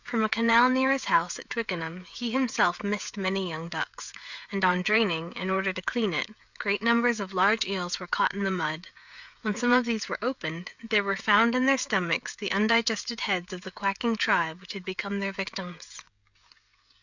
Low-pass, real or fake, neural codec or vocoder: 7.2 kHz; fake; codec, 16 kHz, 16 kbps, FreqCodec, smaller model